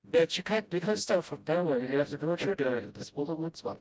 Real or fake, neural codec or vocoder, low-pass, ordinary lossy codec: fake; codec, 16 kHz, 0.5 kbps, FreqCodec, smaller model; none; none